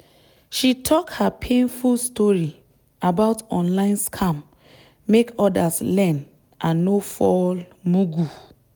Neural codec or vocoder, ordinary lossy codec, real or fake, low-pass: none; none; real; none